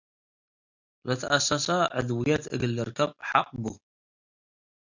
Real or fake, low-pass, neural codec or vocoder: real; 7.2 kHz; none